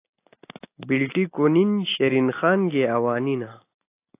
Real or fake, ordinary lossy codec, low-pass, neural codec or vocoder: real; AAC, 24 kbps; 3.6 kHz; none